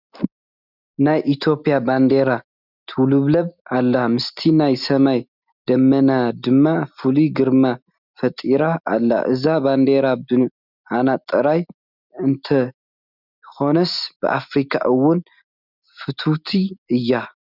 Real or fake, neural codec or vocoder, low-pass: real; none; 5.4 kHz